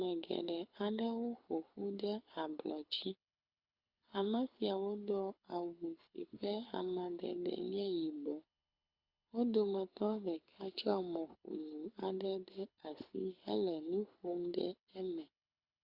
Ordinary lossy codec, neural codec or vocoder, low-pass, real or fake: Opus, 64 kbps; codec, 24 kHz, 6 kbps, HILCodec; 5.4 kHz; fake